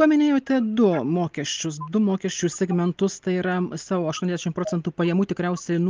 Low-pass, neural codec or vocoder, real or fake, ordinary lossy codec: 7.2 kHz; none; real; Opus, 32 kbps